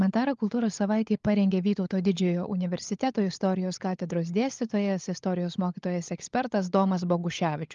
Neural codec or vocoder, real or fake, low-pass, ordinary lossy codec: codec, 16 kHz, 16 kbps, FunCodec, trained on LibriTTS, 50 frames a second; fake; 7.2 kHz; Opus, 32 kbps